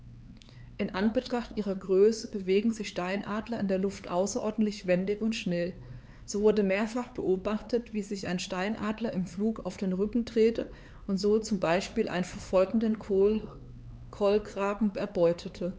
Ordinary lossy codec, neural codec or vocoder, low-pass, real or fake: none; codec, 16 kHz, 4 kbps, X-Codec, HuBERT features, trained on LibriSpeech; none; fake